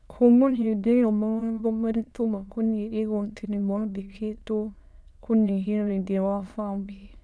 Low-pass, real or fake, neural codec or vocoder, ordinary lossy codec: none; fake; autoencoder, 22.05 kHz, a latent of 192 numbers a frame, VITS, trained on many speakers; none